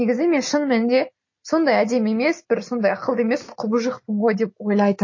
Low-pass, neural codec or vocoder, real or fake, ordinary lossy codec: 7.2 kHz; none; real; MP3, 32 kbps